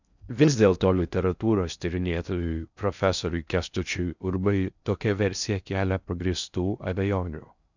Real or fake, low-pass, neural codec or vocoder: fake; 7.2 kHz; codec, 16 kHz in and 24 kHz out, 0.6 kbps, FocalCodec, streaming, 4096 codes